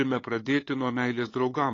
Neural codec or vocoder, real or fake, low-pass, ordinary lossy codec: codec, 16 kHz, 8 kbps, FunCodec, trained on LibriTTS, 25 frames a second; fake; 7.2 kHz; AAC, 32 kbps